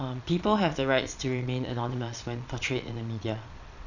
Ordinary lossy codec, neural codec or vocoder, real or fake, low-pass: none; vocoder, 22.05 kHz, 80 mel bands, Vocos; fake; 7.2 kHz